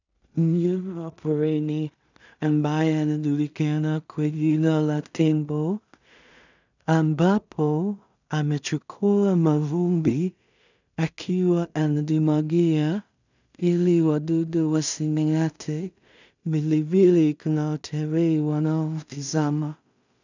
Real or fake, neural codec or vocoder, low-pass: fake; codec, 16 kHz in and 24 kHz out, 0.4 kbps, LongCat-Audio-Codec, two codebook decoder; 7.2 kHz